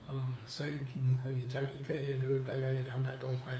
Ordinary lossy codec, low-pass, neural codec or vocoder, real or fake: none; none; codec, 16 kHz, 2 kbps, FunCodec, trained on LibriTTS, 25 frames a second; fake